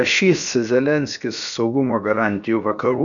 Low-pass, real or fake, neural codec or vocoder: 7.2 kHz; fake; codec, 16 kHz, 0.7 kbps, FocalCodec